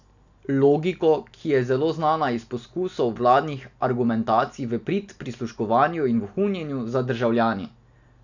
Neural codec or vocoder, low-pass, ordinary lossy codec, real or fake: none; 7.2 kHz; none; real